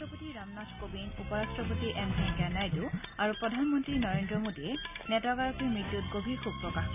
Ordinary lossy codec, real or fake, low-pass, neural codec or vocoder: none; real; 3.6 kHz; none